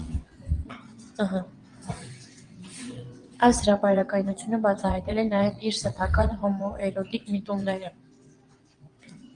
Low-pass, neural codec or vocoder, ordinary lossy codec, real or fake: 9.9 kHz; vocoder, 22.05 kHz, 80 mel bands, WaveNeXt; Opus, 32 kbps; fake